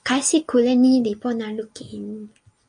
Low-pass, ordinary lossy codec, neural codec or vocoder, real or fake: 9.9 kHz; MP3, 48 kbps; none; real